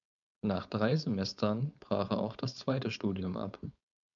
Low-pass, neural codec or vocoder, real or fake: 7.2 kHz; codec, 16 kHz, 4.8 kbps, FACodec; fake